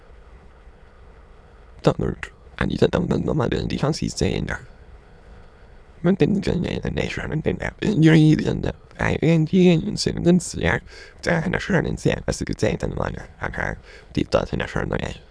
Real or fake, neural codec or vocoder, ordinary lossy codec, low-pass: fake; autoencoder, 22.05 kHz, a latent of 192 numbers a frame, VITS, trained on many speakers; none; none